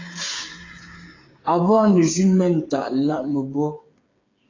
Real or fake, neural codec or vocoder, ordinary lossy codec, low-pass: fake; codec, 16 kHz, 8 kbps, FreqCodec, smaller model; AAC, 32 kbps; 7.2 kHz